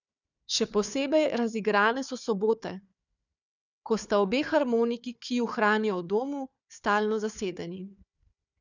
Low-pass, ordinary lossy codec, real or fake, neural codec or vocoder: 7.2 kHz; none; fake; codec, 16 kHz, 4 kbps, FunCodec, trained on Chinese and English, 50 frames a second